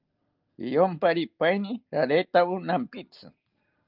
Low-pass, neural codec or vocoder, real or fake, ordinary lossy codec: 5.4 kHz; none; real; Opus, 32 kbps